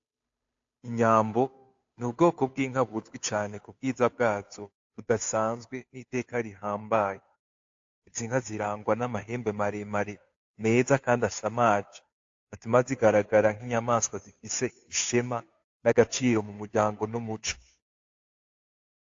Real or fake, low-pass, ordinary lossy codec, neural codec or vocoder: fake; 7.2 kHz; AAC, 48 kbps; codec, 16 kHz, 2 kbps, FunCodec, trained on Chinese and English, 25 frames a second